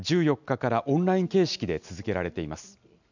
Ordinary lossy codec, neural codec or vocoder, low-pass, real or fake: none; none; 7.2 kHz; real